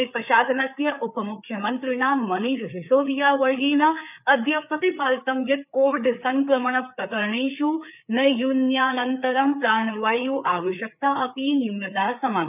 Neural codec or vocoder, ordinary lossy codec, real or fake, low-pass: codec, 16 kHz, 4 kbps, FreqCodec, larger model; none; fake; 3.6 kHz